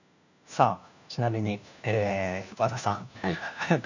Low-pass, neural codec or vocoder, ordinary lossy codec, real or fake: 7.2 kHz; codec, 16 kHz, 1 kbps, FunCodec, trained on LibriTTS, 50 frames a second; none; fake